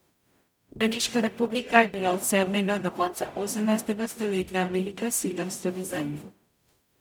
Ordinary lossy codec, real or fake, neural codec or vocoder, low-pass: none; fake; codec, 44.1 kHz, 0.9 kbps, DAC; none